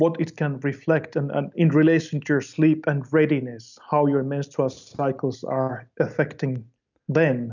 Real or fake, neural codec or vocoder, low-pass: real; none; 7.2 kHz